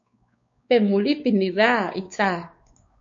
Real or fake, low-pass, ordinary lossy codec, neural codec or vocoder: fake; 7.2 kHz; MP3, 48 kbps; codec, 16 kHz, 4 kbps, X-Codec, WavLM features, trained on Multilingual LibriSpeech